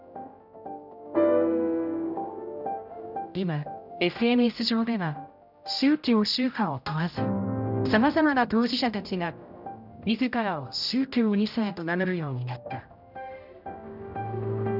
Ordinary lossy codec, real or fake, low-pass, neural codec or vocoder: none; fake; 5.4 kHz; codec, 16 kHz, 0.5 kbps, X-Codec, HuBERT features, trained on general audio